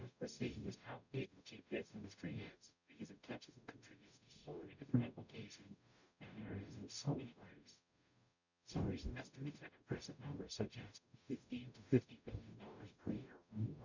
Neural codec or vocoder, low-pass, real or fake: codec, 44.1 kHz, 0.9 kbps, DAC; 7.2 kHz; fake